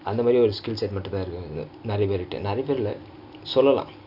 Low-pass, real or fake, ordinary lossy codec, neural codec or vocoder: 5.4 kHz; real; none; none